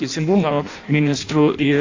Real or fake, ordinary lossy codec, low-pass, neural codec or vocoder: fake; AAC, 48 kbps; 7.2 kHz; codec, 16 kHz in and 24 kHz out, 0.6 kbps, FireRedTTS-2 codec